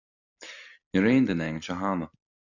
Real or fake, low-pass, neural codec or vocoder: real; 7.2 kHz; none